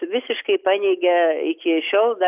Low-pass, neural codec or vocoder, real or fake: 3.6 kHz; none; real